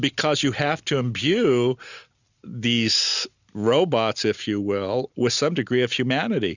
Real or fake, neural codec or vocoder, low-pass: real; none; 7.2 kHz